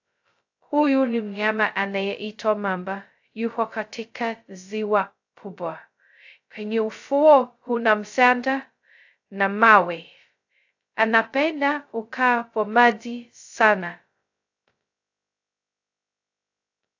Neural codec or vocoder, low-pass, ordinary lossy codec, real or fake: codec, 16 kHz, 0.2 kbps, FocalCodec; 7.2 kHz; AAC, 48 kbps; fake